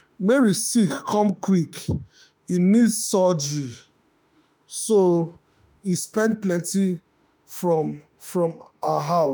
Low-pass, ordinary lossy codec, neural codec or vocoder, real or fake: none; none; autoencoder, 48 kHz, 32 numbers a frame, DAC-VAE, trained on Japanese speech; fake